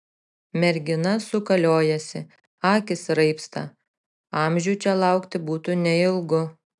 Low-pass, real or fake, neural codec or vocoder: 10.8 kHz; real; none